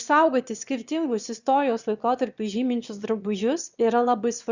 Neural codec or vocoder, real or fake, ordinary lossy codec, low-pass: autoencoder, 22.05 kHz, a latent of 192 numbers a frame, VITS, trained on one speaker; fake; Opus, 64 kbps; 7.2 kHz